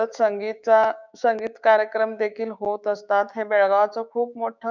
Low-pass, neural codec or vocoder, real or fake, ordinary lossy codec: 7.2 kHz; codec, 16 kHz, 6 kbps, DAC; fake; none